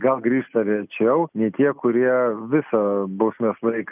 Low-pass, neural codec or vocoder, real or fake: 3.6 kHz; none; real